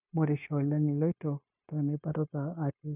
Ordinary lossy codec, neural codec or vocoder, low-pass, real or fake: none; codec, 16 kHz, 0.9 kbps, LongCat-Audio-Codec; 3.6 kHz; fake